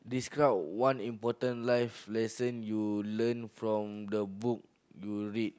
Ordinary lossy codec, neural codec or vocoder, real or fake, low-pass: none; none; real; none